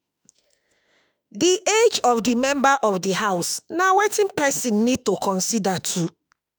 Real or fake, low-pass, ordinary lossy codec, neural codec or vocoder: fake; none; none; autoencoder, 48 kHz, 32 numbers a frame, DAC-VAE, trained on Japanese speech